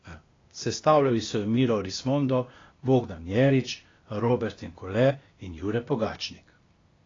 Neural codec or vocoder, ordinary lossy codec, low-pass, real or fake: codec, 16 kHz, 0.8 kbps, ZipCodec; AAC, 32 kbps; 7.2 kHz; fake